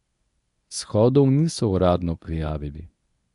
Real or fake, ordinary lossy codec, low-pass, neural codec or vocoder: fake; none; 10.8 kHz; codec, 24 kHz, 0.9 kbps, WavTokenizer, medium speech release version 1